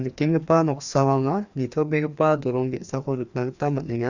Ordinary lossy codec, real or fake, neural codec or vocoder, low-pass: none; fake; codec, 16 kHz, 2 kbps, FreqCodec, larger model; 7.2 kHz